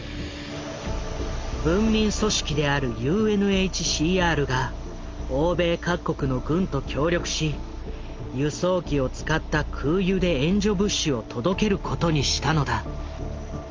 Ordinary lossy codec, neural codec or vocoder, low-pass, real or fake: Opus, 32 kbps; none; 7.2 kHz; real